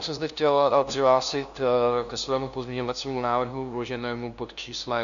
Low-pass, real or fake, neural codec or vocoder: 7.2 kHz; fake; codec, 16 kHz, 0.5 kbps, FunCodec, trained on LibriTTS, 25 frames a second